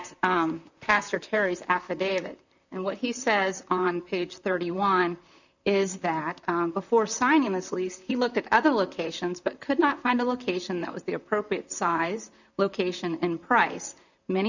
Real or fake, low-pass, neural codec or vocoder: fake; 7.2 kHz; vocoder, 44.1 kHz, 128 mel bands, Pupu-Vocoder